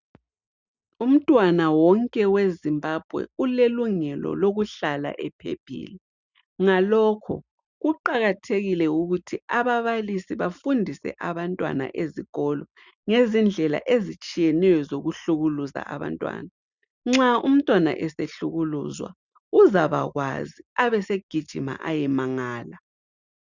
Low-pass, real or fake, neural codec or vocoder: 7.2 kHz; real; none